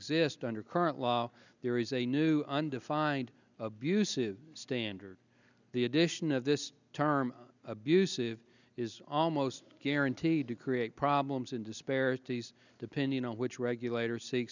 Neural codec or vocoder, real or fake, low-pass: none; real; 7.2 kHz